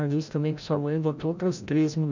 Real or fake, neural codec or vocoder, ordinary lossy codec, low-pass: fake; codec, 16 kHz, 0.5 kbps, FreqCodec, larger model; none; 7.2 kHz